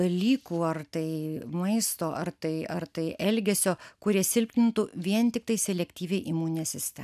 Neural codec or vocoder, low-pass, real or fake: none; 14.4 kHz; real